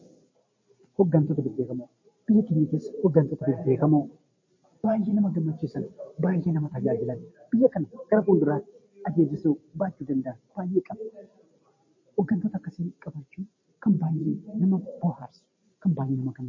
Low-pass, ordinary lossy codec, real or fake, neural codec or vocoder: 7.2 kHz; MP3, 32 kbps; fake; vocoder, 44.1 kHz, 128 mel bands every 512 samples, BigVGAN v2